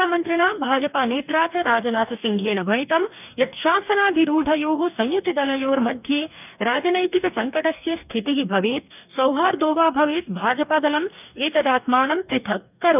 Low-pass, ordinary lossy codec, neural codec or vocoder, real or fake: 3.6 kHz; none; codec, 44.1 kHz, 2.6 kbps, DAC; fake